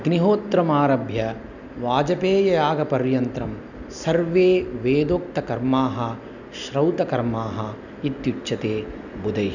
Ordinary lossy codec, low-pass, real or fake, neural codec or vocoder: none; 7.2 kHz; real; none